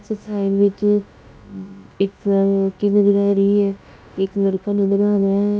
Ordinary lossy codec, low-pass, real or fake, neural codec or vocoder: none; none; fake; codec, 16 kHz, about 1 kbps, DyCAST, with the encoder's durations